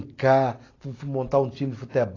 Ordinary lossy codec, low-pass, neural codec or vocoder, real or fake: AAC, 32 kbps; 7.2 kHz; none; real